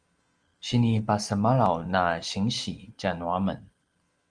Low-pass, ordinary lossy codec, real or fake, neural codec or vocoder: 9.9 kHz; Opus, 24 kbps; real; none